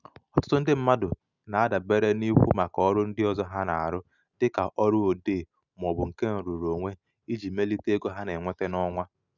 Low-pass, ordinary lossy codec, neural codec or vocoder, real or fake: 7.2 kHz; none; none; real